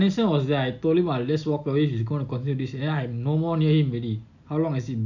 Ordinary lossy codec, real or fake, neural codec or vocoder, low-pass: none; real; none; 7.2 kHz